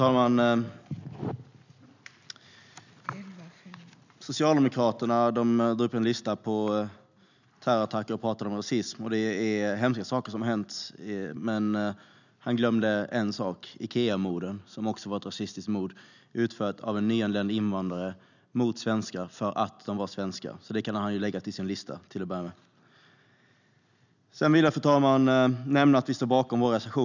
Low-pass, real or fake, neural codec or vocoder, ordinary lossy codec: 7.2 kHz; real; none; none